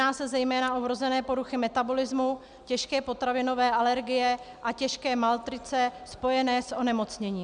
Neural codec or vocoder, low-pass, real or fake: none; 9.9 kHz; real